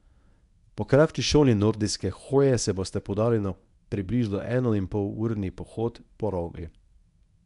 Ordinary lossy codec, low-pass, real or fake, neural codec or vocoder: none; 10.8 kHz; fake; codec, 24 kHz, 0.9 kbps, WavTokenizer, medium speech release version 1